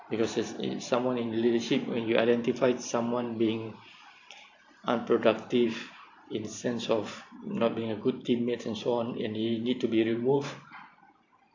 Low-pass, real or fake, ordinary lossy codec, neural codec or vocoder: 7.2 kHz; fake; AAC, 48 kbps; vocoder, 44.1 kHz, 128 mel bands every 512 samples, BigVGAN v2